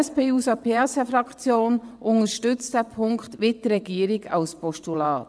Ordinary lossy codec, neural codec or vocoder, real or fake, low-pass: none; vocoder, 22.05 kHz, 80 mel bands, WaveNeXt; fake; none